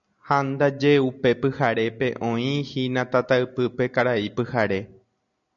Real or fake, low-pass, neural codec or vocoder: real; 7.2 kHz; none